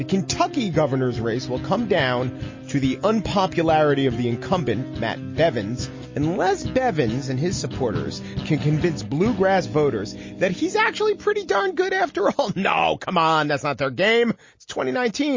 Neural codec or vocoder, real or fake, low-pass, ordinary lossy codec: none; real; 7.2 kHz; MP3, 32 kbps